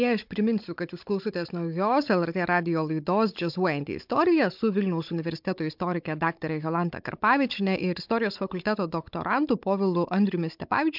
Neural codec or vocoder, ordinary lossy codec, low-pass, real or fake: codec, 16 kHz, 16 kbps, FunCodec, trained on Chinese and English, 50 frames a second; MP3, 48 kbps; 5.4 kHz; fake